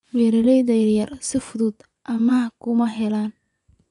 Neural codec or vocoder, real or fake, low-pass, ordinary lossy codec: vocoder, 24 kHz, 100 mel bands, Vocos; fake; 10.8 kHz; none